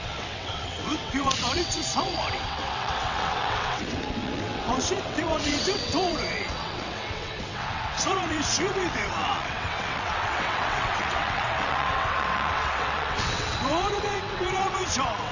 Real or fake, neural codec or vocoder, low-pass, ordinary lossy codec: fake; vocoder, 22.05 kHz, 80 mel bands, WaveNeXt; 7.2 kHz; none